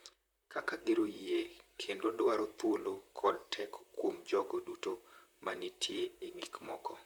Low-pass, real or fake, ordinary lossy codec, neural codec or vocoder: none; fake; none; vocoder, 44.1 kHz, 128 mel bands, Pupu-Vocoder